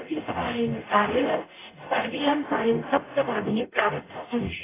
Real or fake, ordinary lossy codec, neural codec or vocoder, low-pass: fake; AAC, 16 kbps; codec, 44.1 kHz, 0.9 kbps, DAC; 3.6 kHz